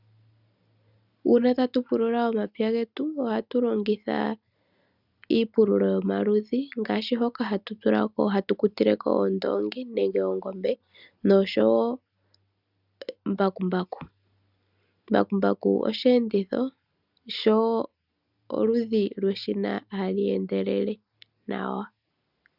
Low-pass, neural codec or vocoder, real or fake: 5.4 kHz; none; real